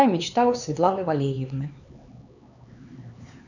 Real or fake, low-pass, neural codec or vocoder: fake; 7.2 kHz; codec, 16 kHz, 4 kbps, X-Codec, HuBERT features, trained on LibriSpeech